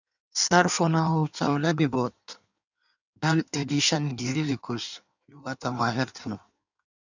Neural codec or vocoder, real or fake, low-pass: codec, 16 kHz in and 24 kHz out, 1.1 kbps, FireRedTTS-2 codec; fake; 7.2 kHz